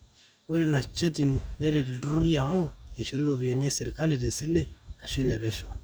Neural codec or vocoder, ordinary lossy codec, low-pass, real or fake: codec, 44.1 kHz, 2.6 kbps, DAC; none; none; fake